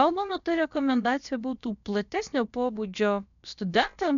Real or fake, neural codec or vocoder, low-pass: fake; codec, 16 kHz, about 1 kbps, DyCAST, with the encoder's durations; 7.2 kHz